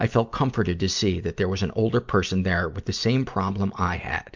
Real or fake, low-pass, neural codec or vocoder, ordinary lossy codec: real; 7.2 kHz; none; MP3, 64 kbps